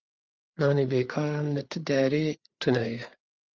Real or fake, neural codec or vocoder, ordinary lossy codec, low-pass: fake; codec, 16 kHz, 4 kbps, FreqCodec, larger model; Opus, 32 kbps; 7.2 kHz